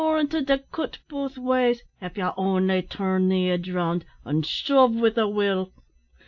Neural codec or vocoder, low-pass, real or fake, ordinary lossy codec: none; 7.2 kHz; real; AAC, 48 kbps